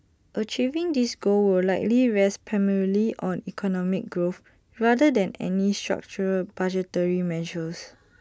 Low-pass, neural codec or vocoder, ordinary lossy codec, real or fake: none; none; none; real